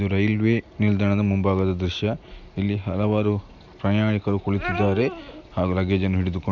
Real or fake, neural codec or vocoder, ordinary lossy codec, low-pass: real; none; none; 7.2 kHz